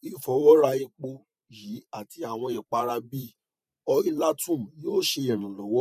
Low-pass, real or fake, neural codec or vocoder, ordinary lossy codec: 14.4 kHz; fake; vocoder, 44.1 kHz, 128 mel bands every 512 samples, BigVGAN v2; none